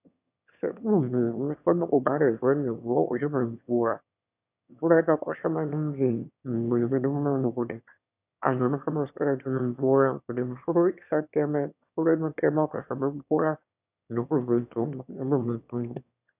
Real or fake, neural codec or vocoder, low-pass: fake; autoencoder, 22.05 kHz, a latent of 192 numbers a frame, VITS, trained on one speaker; 3.6 kHz